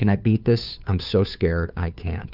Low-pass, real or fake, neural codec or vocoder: 5.4 kHz; fake; codec, 16 kHz, 2 kbps, FunCodec, trained on Chinese and English, 25 frames a second